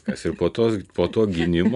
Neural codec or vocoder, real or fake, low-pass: none; real; 10.8 kHz